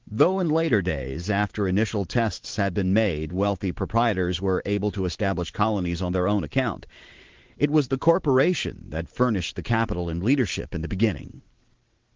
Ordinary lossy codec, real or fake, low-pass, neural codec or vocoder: Opus, 16 kbps; fake; 7.2 kHz; vocoder, 44.1 kHz, 128 mel bands every 512 samples, BigVGAN v2